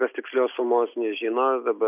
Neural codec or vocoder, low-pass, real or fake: none; 3.6 kHz; real